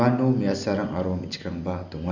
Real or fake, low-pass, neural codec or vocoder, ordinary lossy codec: real; 7.2 kHz; none; none